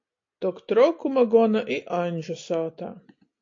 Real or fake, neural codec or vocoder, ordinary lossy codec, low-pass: real; none; AAC, 48 kbps; 7.2 kHz